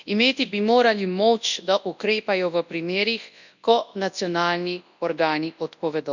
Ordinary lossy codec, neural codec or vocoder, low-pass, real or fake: none; codec, 24 kHz, 0.9 kbps, WavTokenizer, large speech release; 7.2 kHz; fake